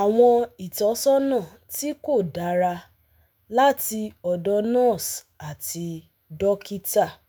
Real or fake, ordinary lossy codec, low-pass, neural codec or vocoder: fake; none; none; autoencoder, 48 kHz, 128 numbers a frame, DAC-VAE, trained on Japanese speech